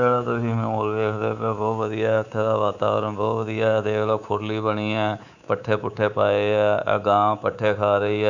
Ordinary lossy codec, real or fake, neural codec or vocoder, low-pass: none; fake; codec, 24 kHz, 3.1 kbps, DualCodec; 7.2 kHz